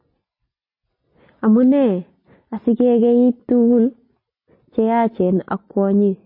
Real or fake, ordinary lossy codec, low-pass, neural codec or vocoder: real; MP3, 24 kbps; 5.4 kHz; none